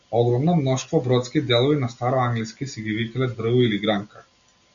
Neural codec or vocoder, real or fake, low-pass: none; real; 7.2 kHz